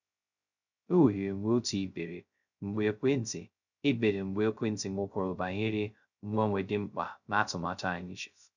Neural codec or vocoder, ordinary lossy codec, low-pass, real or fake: codec, 16 kHz, 0.2 kbps, FocalCodec; none; 7.2 kHz; fake